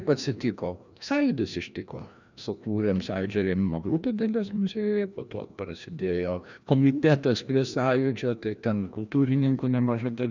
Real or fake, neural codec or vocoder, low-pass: fake; codec, 16 kHz, 1 kbps, FreqCodec, larger model; 7.2 kHz